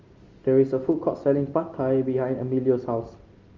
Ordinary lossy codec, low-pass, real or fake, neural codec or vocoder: Opus, 32 kbps; 7.2 kHz; real; none